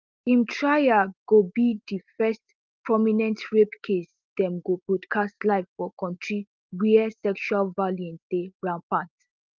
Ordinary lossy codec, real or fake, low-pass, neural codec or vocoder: Opus, 32 kbps; real; 7.2 kHz; none